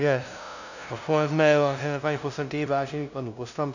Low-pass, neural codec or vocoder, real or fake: 7.2 kHz; codec, 16 kHz, 0.5 kbps, FunCodec, trained on LibriTTS, 25 frames a second; fake